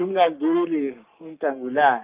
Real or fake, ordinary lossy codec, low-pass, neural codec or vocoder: fake; Opus, 32 kbps; 3.6 kHz; codec, 44.1 kHz, 3.4 kbps, Pupu-Codec